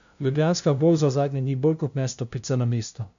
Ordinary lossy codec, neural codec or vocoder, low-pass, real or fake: none; codec, 16 kHz, 0.5 kbps, FunCodec, trained on LibriTTS, 25 frames a second; 7.2 kHz; fake